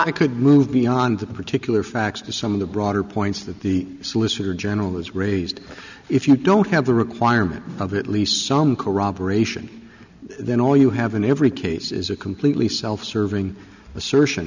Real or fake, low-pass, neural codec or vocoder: real; 7.2 kHz; none